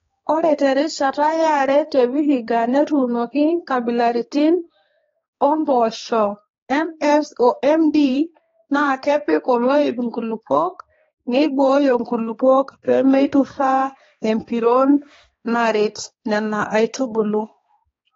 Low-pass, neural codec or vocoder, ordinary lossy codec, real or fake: 7.2 kHz; codec, 16 kHz, 2 kbps, X-Codec, HuBERT features, trained on balanced general audio; AAC, 24 kbps; fake